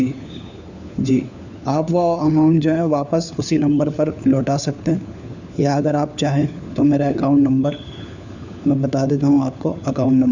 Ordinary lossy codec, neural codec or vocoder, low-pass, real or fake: none; codec, 16 kHz, 4 kbps, FunCodec, trained on LibriTTS, 50 frames a second; 7.2 kHz; fake